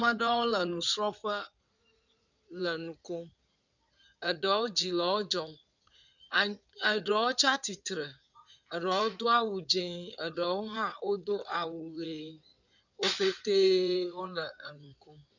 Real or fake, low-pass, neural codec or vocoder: fake; 7.2 kHz; codec, 16 kHz in and 24 kHz out, 2.2 kbps, FireRedTTS-2 codec